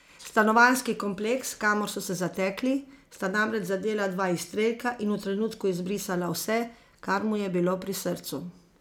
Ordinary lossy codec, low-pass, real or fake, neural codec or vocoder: none; 19.8 kHz; real; none